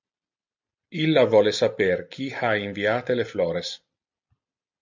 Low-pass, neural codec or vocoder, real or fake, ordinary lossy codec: 7.2 kHz; none; real; MP3, 48 kbps